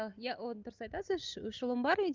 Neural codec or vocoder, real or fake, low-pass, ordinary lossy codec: none; real; 7.2 kHz; Opus, 24 kbps